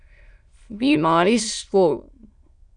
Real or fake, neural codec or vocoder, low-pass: fake; autoencoder, 22.05 kHz, a latent of 192 numbers a frame, VITS, trained on many speakers; 9.9 kHz